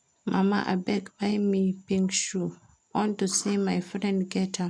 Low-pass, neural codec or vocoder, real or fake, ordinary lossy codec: 9.9 kHz; none; real; none